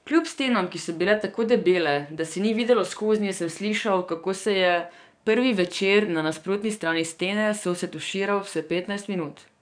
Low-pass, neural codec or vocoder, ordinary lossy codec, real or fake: 9.9 kHz; codec, 44.1 kHz, 7.8 kbps, DAC; none; fake